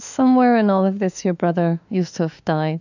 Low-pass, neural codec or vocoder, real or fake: 7.2 kHz; autoencoder, 48 kHz, 32 numbers a frame, DAC-VAE, trained on Japanese speech; fake